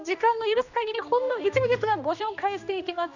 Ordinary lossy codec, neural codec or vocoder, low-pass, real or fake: none; codec, 16 kHz, 1 kbps, X-Codec, HuBERT features, trained on general audio; 7.2 kHz; fake